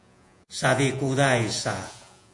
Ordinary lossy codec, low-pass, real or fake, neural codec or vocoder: AAC, 64 kbps; 10.8 kHz; fake; vocoder, 48 kHz, 128 mel bands, Vocos